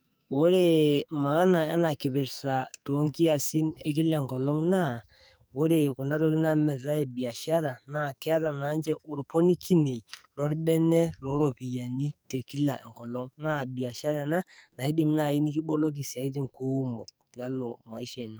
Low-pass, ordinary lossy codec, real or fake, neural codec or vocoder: none; none; fake; codec, 44.1 kHz, 2.6 kbps, SNAC